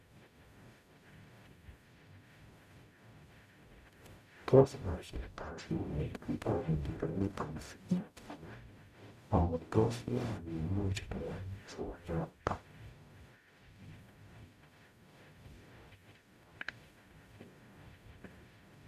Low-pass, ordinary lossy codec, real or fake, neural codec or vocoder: 14.4 kHz; none; fake; codec, 44.1 kHz, 0.9 kbps, DAC